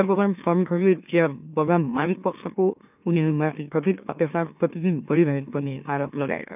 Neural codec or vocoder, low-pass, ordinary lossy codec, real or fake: autoencoder, 44.1 kHz, a latent of 192 numbers a frame, MeloTTS; 3.6 kHz; none; fake